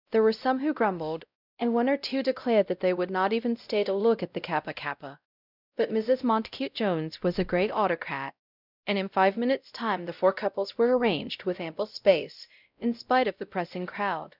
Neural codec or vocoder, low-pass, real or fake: codec, 16 kHz, 0.5 kbps, X-Codec, WavLM features, trained on Multilingual LibriSpeech; 5.4 kHz; fake